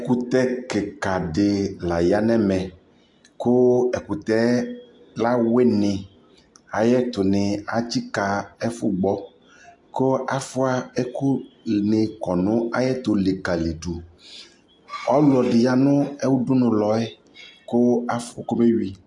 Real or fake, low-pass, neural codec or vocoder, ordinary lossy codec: real; 10.8 kHz; none; AAC, 64 kbps